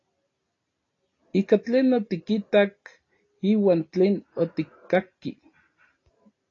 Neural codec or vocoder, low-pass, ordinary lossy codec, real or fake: none; 7.2 kHz; AAC, 32 kbps; real